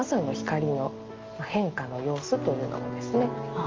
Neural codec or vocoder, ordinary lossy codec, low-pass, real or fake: none; Opus, 32 kbps; 7.2 kHz; real